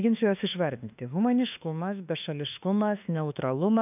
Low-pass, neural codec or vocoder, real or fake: 3.6 kHz; autoencoder, 48 kHz, 32 numbers a frame, DAC-VAE, trained on Japanese speech; fake